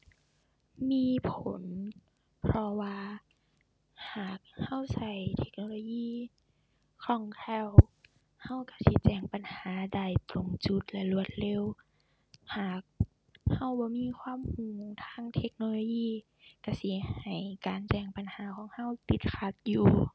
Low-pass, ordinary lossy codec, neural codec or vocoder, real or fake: none; none; none; real